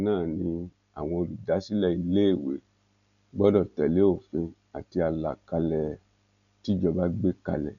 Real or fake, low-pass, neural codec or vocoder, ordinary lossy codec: real; 7.2 kHz; none; none